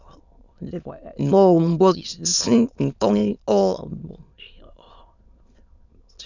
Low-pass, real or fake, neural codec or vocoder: 7.2 kHz; fake; autoencoder, 22.05 kHz, a latent of 192 numbers a frame, VITS, trained on many speakers